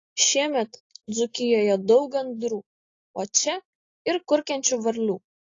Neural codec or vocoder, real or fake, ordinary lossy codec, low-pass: none; real; AAC, 32 kbps; 7.2 kHz